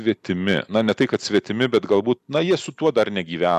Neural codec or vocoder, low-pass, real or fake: none; 14.4 kHz; real